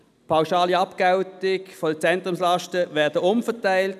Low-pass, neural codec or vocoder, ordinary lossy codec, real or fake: 14.4 kHz; none; none; real